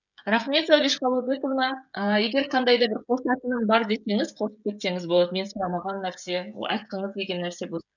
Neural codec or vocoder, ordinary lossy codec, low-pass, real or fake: codec, 16 kHz, 16 kbps, FreqCodec, smaller model; none; 7.2 kHz; fake